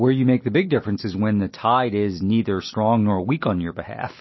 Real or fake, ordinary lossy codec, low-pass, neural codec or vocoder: real; MP3, 24 kbps; 7.2 kHz; none